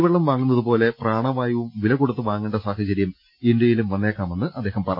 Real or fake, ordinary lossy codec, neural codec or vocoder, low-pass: real; AAC, 48 kbps; none; 5.4 kHz